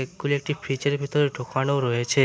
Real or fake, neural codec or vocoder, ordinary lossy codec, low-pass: real; none; none; none